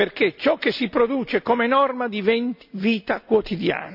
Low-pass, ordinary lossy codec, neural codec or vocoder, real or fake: 5.4 kHz; none; none; real